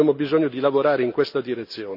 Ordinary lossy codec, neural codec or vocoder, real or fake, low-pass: none; none; real; 5.4 kHz